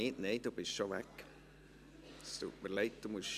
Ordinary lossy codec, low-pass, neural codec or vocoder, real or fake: none; 14.4 kHz; none; real